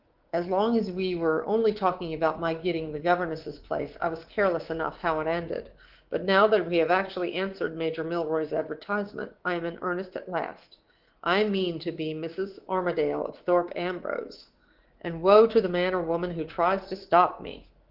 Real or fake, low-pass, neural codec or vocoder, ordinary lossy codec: fake; 5.4 kHz; codec, 24 kHz, 3.1 kbps, DualCodec; Opus, 16 kbps